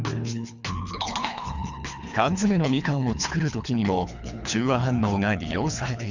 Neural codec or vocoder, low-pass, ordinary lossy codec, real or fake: codec, 24 kHz, 3 kbps, HILCodec; 7.2 kHz; none; fake